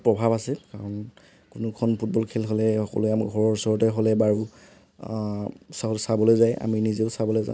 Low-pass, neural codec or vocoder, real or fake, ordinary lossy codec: none; none; real; none